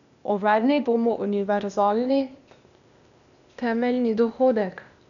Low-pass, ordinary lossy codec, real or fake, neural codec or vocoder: 7.2 kHz; none; fake; codec, 16 kHz, 0.8 kbps, ZipCodec